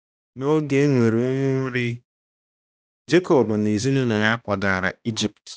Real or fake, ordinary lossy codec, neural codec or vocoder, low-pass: fake; none; codec, 16 kHz, 1 kbps, X-Codec, HuBERT features, trained on balanced general audio; none